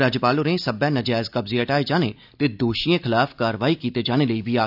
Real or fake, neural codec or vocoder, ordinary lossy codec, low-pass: real; none; none; 5.4 kHz